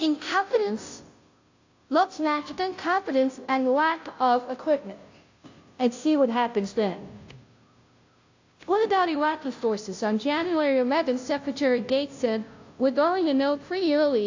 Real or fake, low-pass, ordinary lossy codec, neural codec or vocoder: fake; 7.2 kHz; AAC, 48 kbps; codec, 16 kHz, 0.5 kbps, FunCodec, trained on Chinese and English, 25 frames a second